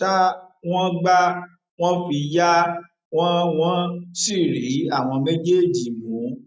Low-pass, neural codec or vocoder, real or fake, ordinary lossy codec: none; none; real; none